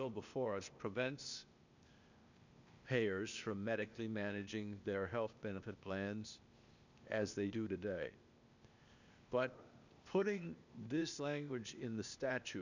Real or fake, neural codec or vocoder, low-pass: fake; codec, 16 kHz, 0.8 kbps, ZipCodec; 7.2 kHz